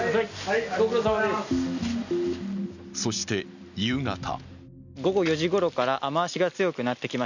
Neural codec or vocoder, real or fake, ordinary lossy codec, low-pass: none; real; none; 7.2 kHz